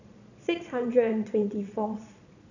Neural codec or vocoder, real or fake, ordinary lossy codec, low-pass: vocoder, 22.05 kHz, 80 mel bands, Vocos; fake; none; 7.2 kHz